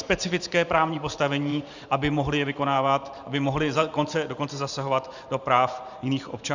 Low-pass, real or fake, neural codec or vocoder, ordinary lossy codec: 7.2 kHz; fake; vocoder, 44.1 kHz, 128 mel bands every 512 samples, BigVGAN v2; Opus, 64 kbps